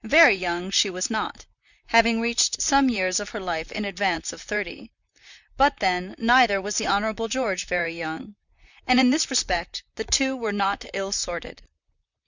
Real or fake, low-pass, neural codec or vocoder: fake; 7.2 kHz; vocoder, 44.1 kHz, 128 mel bands, Pupu-Vocoder